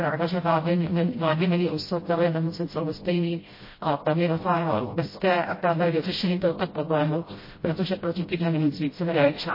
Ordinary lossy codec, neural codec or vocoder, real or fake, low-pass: MP3, 24 kbps; codec, 16 kHz, 0.5 kbps, FreqCodec, smaller model; fake; 5.4 kHz